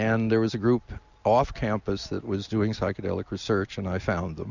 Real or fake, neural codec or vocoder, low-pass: real; none; 7.2 kHz